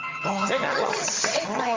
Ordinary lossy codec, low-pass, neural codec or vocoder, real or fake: Opus, 32 kbps; 7.2 kHz; vocoder, 22.05 kHz, 80 mel bands, HiFi-GAN; fake